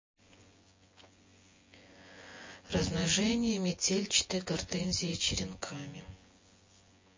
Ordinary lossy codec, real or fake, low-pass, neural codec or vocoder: MP3, 32 kbps; fake; 7.2 kHz; vocoder, 24 kHz, 100 mel bands, Vocos